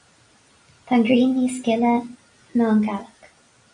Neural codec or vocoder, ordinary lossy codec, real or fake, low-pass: none; MP3, 48 kbps; real; 9.9 kHz